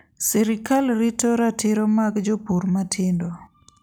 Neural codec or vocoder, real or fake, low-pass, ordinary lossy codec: none; real; none; none